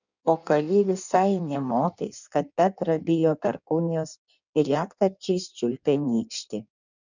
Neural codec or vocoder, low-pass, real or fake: codec, 16 kHz in and 24 kHz out, 1.1 kbps, FireRedTTS-2 codec; 7.2 kHz; fake